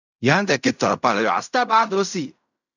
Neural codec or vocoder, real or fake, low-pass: codec, 16 kHz in and 24 kHz out, 0.4 kbps, LongCat-Audio-Codec, fine tuned four codebook decoder; fake; 7.2 kHz